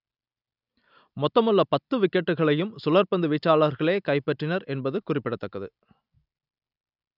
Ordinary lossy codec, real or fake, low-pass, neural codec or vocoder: none; real; 5.4 kHz; none